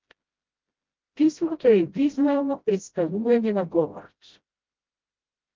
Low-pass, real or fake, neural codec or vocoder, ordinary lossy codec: 7.2 kHz; fake; codec, 16 kHz, 0.5 kbps, FreqCodec, smaller model; Opus, 24 kbps